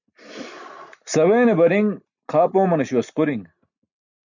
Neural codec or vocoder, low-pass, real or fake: none; 7.2 kHz; real